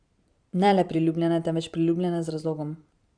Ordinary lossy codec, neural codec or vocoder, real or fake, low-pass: Opus, 64 kbps; vocoder, 44.1 kHz, 128 mel bands every 512 samples, BigVGAN v2; fake; 9.9 kHz